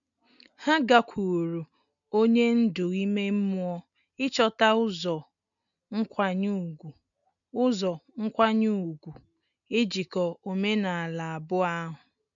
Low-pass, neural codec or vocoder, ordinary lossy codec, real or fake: 7.2 kHz; none; none; real